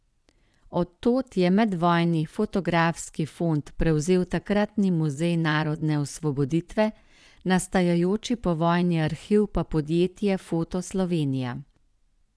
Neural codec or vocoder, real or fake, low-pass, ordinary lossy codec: vocoder, 22.05 kHz, 80 mel bands, Vocos; fake; none; none